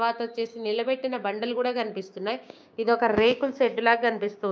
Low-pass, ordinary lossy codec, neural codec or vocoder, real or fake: none; none; codec, 16 kHz, 6 kbps, DAC; fake